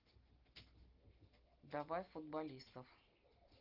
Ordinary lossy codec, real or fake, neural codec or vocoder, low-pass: Opus, 32 kbps; real; none; 5.4 kHz